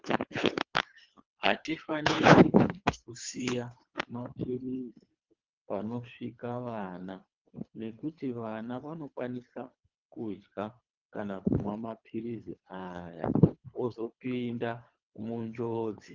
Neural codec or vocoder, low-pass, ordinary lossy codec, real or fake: codec, 24 kHz, 3 kbps, HILCodec; 7.2 kHz; Opus, 24 kbps; fake